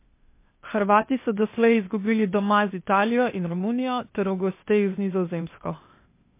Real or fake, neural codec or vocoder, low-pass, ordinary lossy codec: fake; codec, 16 kHz, 0.8 kbps, ZipCodec; 3.6 kHz; MP3, 24 kbps